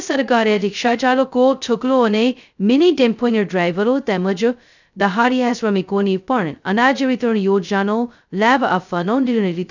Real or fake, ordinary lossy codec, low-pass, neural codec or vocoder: fake; none; 7.2 kHz; codec, 16 kHz, 0.2 kbps, FocalCodec